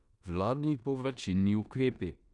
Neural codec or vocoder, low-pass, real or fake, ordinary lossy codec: codec, 16 kHz in and 24 kHz out, 0.9 kbps, LongCat-Audio-Codec, four codebook decoder; 10.8 kHz; fake; none